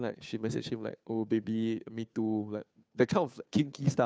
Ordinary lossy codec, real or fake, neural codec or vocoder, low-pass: none; fake; codec, 16 kHz, 2 kbps, FunCodec, trained on Chinese and English, 25 frames a second; none